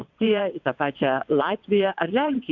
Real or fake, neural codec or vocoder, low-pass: fake; vocoder, 22.05 kHz, 80 mel bands, WaveNeXt; 7.2 kHz